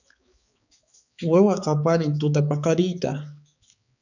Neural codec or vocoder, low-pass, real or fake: codec, 16 kHz, 4 kbps, X-Codec, HuBERT features, trained on balanced general audio; 7.2 kHz; fake